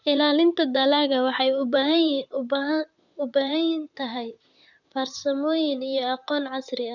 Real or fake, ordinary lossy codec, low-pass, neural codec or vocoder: fake; none; 7.2 kHz; vocoder, 44.1 kHz, 128 mel bands, Pupu-Vocoder